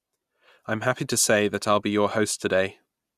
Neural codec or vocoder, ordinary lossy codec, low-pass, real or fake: none; Opus, 64 kbps; 14.4 kHz; real